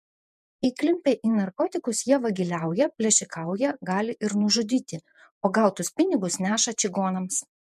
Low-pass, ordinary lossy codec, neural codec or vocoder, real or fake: 14.4 kHz; MP3, 96 kbps; vocoder, 48 kHz, 128 mel bands, Vocos; fake